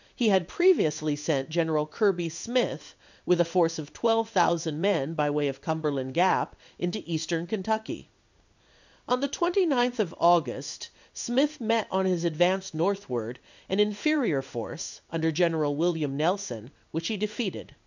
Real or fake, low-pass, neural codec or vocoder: fake; 7.2 kHz; codec, 16 kHz in and 24 kHz out, 1 kbps, XY-Tokenizer